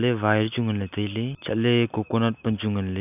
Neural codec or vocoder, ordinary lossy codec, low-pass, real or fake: none; none; 3.6 kHz; real